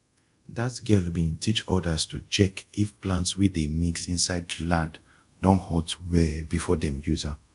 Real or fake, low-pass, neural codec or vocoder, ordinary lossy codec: fake; 10.8 kHz; codec, 24 kHz, 0.5 kbps, DualCodec; none